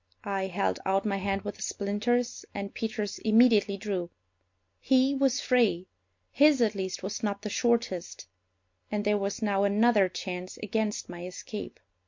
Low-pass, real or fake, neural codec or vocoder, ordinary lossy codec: 7.2 kHz; real; none; MP3, 48 kbps